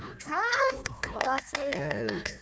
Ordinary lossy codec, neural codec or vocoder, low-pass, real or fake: none; codec, 16 kHz, 1 kbps, FunCodec, trained on Chinese and English, 50 frames a second; none; fake